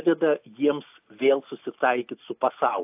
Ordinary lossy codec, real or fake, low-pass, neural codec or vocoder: AAC, 32 kbps; real; 3.6 kHz; none